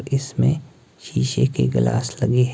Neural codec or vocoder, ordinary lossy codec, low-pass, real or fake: none; none; none; real